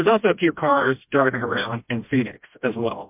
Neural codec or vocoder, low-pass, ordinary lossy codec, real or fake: codec, 16 kHz, 1 kbps, FreqCodec, smaller model; 3.6 kHz; MP3, 32 kbps; fake